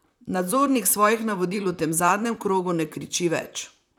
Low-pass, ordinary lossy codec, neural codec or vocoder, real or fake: 19.8 kHz; none; vocoder, 44.1 kHz, 128 mel bands, Pupu-Vocoder; fake